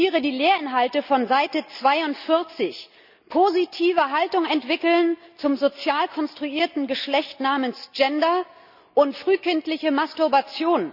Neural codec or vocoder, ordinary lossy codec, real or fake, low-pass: none; none; real; 5.4 kHz